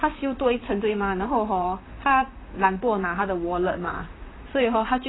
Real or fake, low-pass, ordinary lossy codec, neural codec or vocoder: fake; 7.2 kHz; AAC, 16 kbps; vocoder, 44.1 kHz, 128 mel bands, Pupu-Vocoder